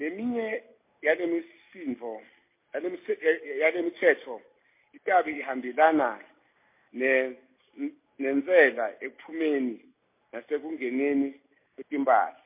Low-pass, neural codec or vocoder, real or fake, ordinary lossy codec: 3.6 kHz; none; real; MP3, 24 kbps